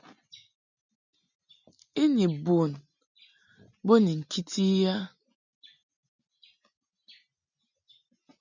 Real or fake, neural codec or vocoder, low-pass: real; none; 7.2 kHz